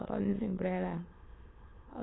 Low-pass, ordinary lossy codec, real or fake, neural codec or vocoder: 7.2 kHz; AAC, 16 kbps; fake; autoencoder, 22.05 kHz, a latent of 192 numbers a frame, VITS, trained on many speakers